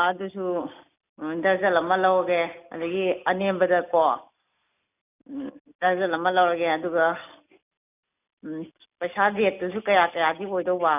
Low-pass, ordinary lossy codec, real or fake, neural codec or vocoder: 3.6 kHz; none; real; none